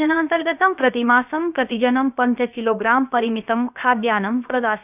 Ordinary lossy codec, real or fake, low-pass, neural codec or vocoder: none; fake; 3.6 kHz; codec, 16 kHz, about 1 kbps, DyCAST, with the encoder's durations